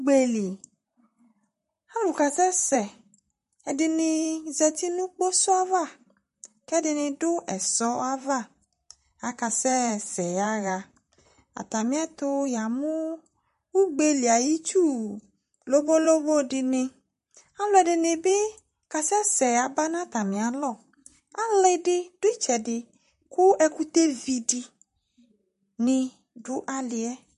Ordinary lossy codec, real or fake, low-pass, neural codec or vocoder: MP3, 48 kbps; fake; 14.4 kHz; vocoder, 44.1 kHz, 128 mel bands, Pupu-Vocoder